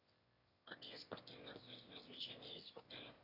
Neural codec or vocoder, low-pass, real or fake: autoencoder, 22.05 kHz, a latent of 192 numbers a frame, VITS, trained on one speaker; 5.4 kHz; fake